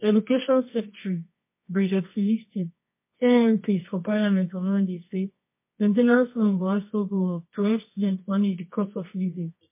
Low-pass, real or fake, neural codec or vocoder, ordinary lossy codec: 3.6 kHz; fake; codec, 24 kHz, 0.9 kbps, WavTokenizer, medium music audio release; MP3, 24 kbps